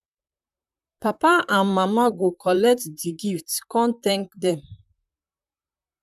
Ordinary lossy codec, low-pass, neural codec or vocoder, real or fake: none; 14.4 kHz; vocoder, 44.1 kHz, 128 mel bands, Pupu-Vocoder; fake